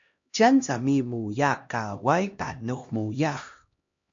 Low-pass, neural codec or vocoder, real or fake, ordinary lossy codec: 7.2 kHz; codec, 16 kHz, 1 kbps, X-Codec, HuBERT features, trained on LibriSpeech; fake; MP3, 48 kbps